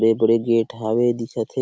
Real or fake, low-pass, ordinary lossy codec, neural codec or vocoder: real; none; none; none